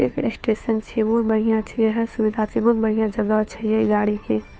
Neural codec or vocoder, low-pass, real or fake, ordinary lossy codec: codec, 16 kHz, 2 kbps, FunCodec, trained on Chinese and English, 25 frames a second; none; fake; none